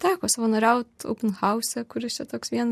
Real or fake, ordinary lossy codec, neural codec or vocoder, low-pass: real; MP3, 64 kbps; none; 14.4 kHz